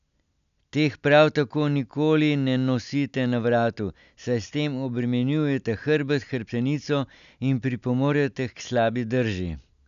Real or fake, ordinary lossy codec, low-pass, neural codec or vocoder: real; none; 7.2 kHz; none